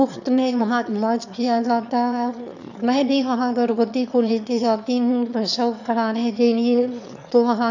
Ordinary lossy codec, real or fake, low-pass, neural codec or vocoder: none; fake; 7.2 kHz; autoencoder, 22.05 kHz, a latent of 192 numbers a frame, VITS, trained on one speaker